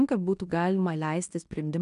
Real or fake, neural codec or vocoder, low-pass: fake; codec, 16 kHz in and 24 kHz out, 0.9 kbps, LongCat-Audio-Codec, fine tuned four codebook decoder; 10.8 kHz